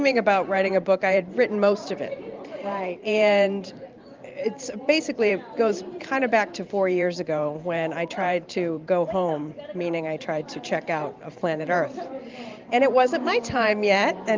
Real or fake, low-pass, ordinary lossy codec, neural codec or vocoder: fake; 7.2 kHz; Opus, 24 kbps; vocoder, 44.1 kHz, 128 mel bands every 512 samples, BigVGAN v2